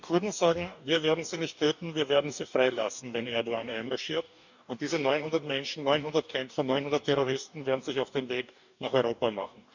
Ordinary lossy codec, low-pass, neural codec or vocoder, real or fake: none; 7.2 kHz; codec, 44.1 kHz, 2.6 kbps, DAC; fake